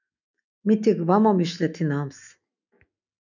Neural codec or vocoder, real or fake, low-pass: autoencoder, 48 kHz, 128 numbers a frame, DAC-VAE, trained on Japanese speech; fake; 7.2 kHz